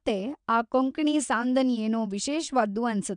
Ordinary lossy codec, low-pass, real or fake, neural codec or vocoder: none; 9.9 kHz; fake; vocoder, 22.05 kHz, 80 mel bands, WaveNeXt